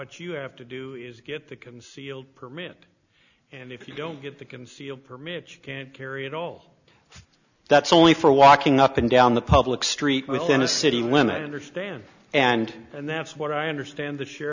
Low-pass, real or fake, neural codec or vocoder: 7.2 kHz; real; none